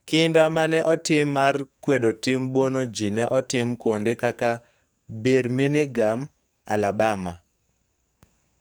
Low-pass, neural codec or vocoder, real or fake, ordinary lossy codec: none; codec, 44.1 kHz, 2.6 kbps, SNAC; fake; none